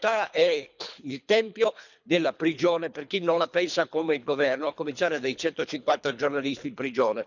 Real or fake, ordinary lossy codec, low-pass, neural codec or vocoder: fake; none; 7.2 kHz; codec, 24 kHz, 3 kbps, HILCodec